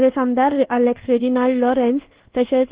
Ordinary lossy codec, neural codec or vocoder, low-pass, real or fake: Opus, 16 kbps; codec, 24 kHz, 1.2 kbps, DualCodec; 3.6 kHz; fake